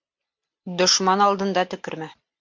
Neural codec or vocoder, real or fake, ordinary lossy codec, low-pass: none; real; MP3, 64 kbps; 7.2 kHz